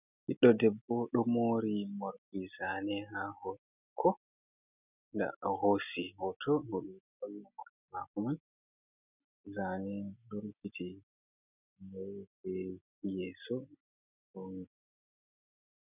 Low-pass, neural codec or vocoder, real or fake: 3.6 kHz; none; real